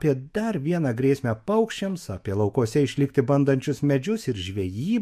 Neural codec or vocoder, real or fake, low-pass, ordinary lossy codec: autoencoder, 48 kHz, 128 numbers a frame, DAC-VAE, trained on Japanese speech; fake; 14.4 kHz; MP3, 64 kbps